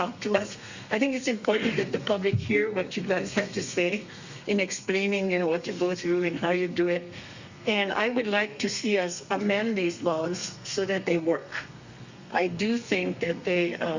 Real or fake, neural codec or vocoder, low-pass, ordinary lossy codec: fake; codec, 32 kHz, 1.9 kbps, SNAC; 7.2 kHz; Opus, 64 kbps